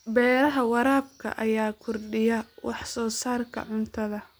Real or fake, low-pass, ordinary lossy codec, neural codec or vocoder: real; none; none; none